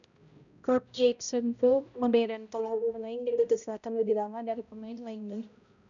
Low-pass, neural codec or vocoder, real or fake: 7.2 kHz; codec, 16 kHz, 0.5 kbps, X-Codec, HuBERT features, trained on balanced general audio; fake